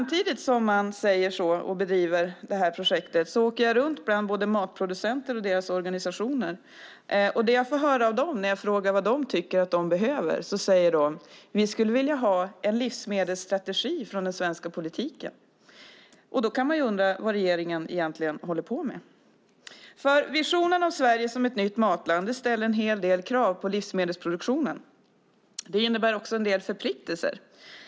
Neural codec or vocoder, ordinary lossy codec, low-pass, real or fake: none; none; none; real